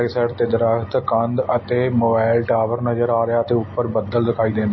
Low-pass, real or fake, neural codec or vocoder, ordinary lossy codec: 7.2 kHz; real; none; MP3, 24 kbps